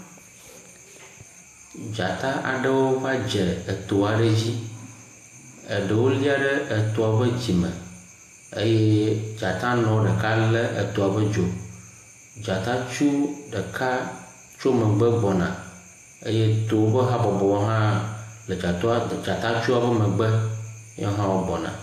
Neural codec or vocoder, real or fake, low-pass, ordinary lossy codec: none; real; 14.4 kHz; AAC, 64 kbps